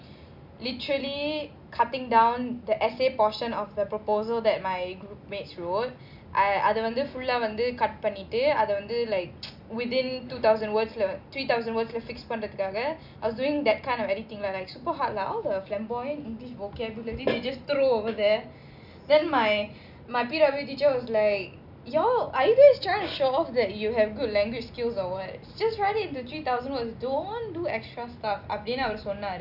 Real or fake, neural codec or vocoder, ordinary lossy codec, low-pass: real; none; none; 5.4 kHz